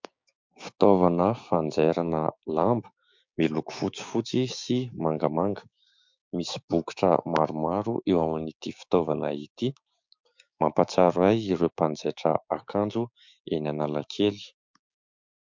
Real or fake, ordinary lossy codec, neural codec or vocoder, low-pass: fake; MP3, 64 kbps; codec, 16 kHz, 6 kbps, DAC; 7.2 kHz